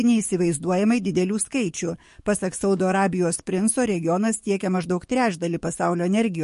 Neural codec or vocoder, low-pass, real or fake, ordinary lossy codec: codec, 44.1 kHz, 7.8 kbps, DAC; 14.4 kHz; fake; MP3, 48 kbps